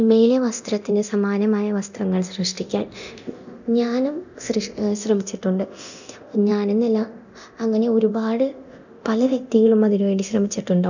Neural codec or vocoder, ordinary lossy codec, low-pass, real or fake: codec, 24 kHz, 0.9 kbps, DualCodec; none; 7.2 kHz; fake